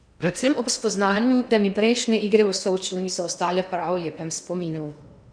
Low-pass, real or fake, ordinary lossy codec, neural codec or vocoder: 9.9 kHz; fake; none; codec, 16 kHz in and 24 kHz out, 0.8 kbps, FocalCodec, streaming, 65536 codes